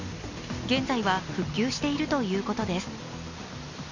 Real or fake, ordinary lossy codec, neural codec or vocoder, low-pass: real; none; none; 7.2 kHz